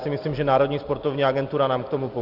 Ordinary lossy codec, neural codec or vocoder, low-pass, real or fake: Opus, 24 kbps; none; 5.4 kHz; real